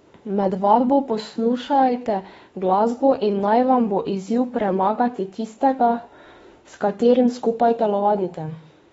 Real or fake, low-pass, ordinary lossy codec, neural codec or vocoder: fake; 19.8 kHz; AAC, 24 kbps; autoencoder, 48 kHz, 32 numbers a frame, DAC-VAE, trained on Japanese speech